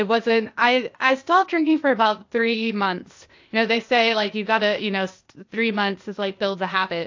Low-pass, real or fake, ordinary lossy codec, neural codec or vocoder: 7.2 kHz; fake; AAC, 48 kbps; codec, 16 kHz, 0.8 kbps, ZipCodec